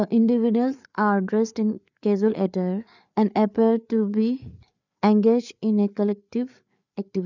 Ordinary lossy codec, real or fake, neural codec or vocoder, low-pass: none; fake; codec, 16 kHz, 4 kbps, FreqCodec, larger model; 7.2 kHz